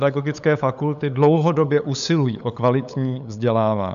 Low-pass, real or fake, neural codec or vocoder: 7.2 kHz; fake; codec, 16 kHz, 8 kbps, FunCodec, trained on LibriTTS, 25 frames a second